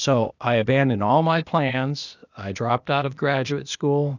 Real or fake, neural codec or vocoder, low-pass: fake; codec, 16 kHz, 0.8 kbps, ZipCodec; 7.2 kHz